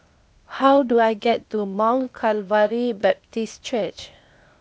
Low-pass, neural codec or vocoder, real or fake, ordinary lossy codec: none; codec, 16 kHz, 0.8 kbps, ZipCodec; fake; none